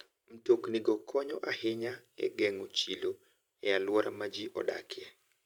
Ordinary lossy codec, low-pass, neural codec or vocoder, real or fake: none; 19.8 kHz; vocoder, 44.1 kHz, 128 mel bands every 512 samples, BigVGAN v2; fake